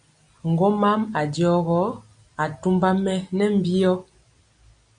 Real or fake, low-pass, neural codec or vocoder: real; 9.9 kHz; none